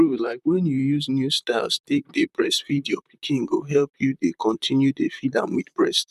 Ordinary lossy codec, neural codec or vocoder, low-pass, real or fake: none; vocoder, 44.1 kHz, 128 mel bands, Pupu-Vocoder; 14.4 kHz; fake